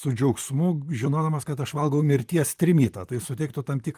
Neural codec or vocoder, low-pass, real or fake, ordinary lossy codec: vocoder, 44.1 kHz, 128 mel bands, Pupu-Vocoder; 14.4 kHz; fake; Opus, 32 kbps